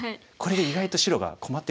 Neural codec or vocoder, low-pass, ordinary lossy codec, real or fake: none; none; none; real